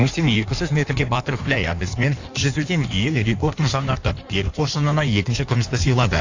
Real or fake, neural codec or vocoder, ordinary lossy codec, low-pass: fake; codec, 16 kHz in and 24 kHz out, 1.1 kbps, FireRedTTS-2 codec; AAC, 48 kbps; 7.2 kHz